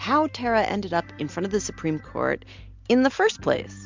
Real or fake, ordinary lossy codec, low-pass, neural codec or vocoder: real; MP3, 64 kbps; 7.2 kHz; none